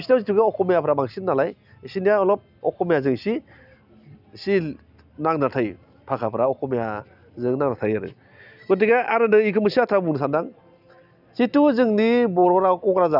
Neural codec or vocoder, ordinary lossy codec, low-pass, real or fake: none; none; 5.4 kHz; real